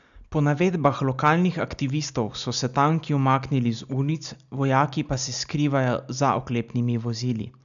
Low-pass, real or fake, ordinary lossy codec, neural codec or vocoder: 7.2 kHz; real; none; none